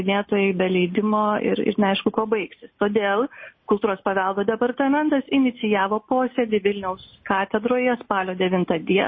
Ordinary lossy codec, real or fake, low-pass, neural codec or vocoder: MP3, 24 kbps; real; 7.2 kHz; none